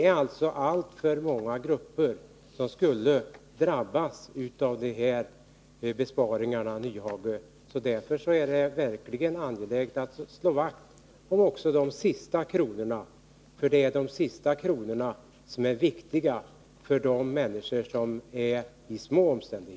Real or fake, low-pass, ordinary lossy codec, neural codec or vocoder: real; none; none; none